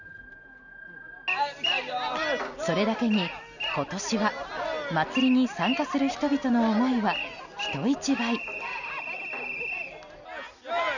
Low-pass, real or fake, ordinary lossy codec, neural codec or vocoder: 7.2 kHz; real; none; none